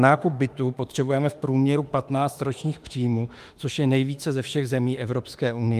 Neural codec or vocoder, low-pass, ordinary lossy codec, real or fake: autoencoder, 48 kHz, 32 numbers a frame, DAC-VAE, trained on Japanese speech; 14.4 kHz; Opus, 32 kbps; fake